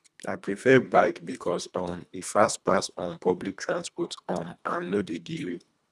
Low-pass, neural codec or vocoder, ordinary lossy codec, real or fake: none; codec, 24 kHz, 1.5 kbps, HILCodec; none; fake